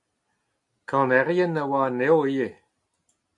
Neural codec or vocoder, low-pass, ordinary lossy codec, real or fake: none; 10.8 kHz; AAC, 64 kbps; real